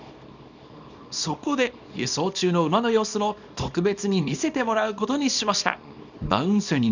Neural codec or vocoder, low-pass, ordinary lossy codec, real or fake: codec, 24 kHz, 0.9 kbps, WavTokenizer, small release; 7.2 kHz; none; fake